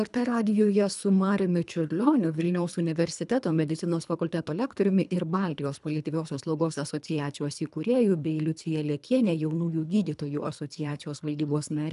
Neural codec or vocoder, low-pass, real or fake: codec, 24 kHz, 3 kbps, HILCodec; 10.8 kHz; fake